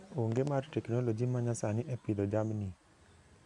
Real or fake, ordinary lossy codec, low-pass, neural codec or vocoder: real; MP3, 96 kbps; 10.8 kHz; none